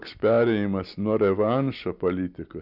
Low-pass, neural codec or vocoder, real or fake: 5.4 kHz; none; real